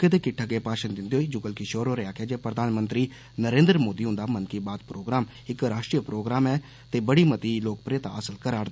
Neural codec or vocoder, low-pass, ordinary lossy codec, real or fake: none; none; none; real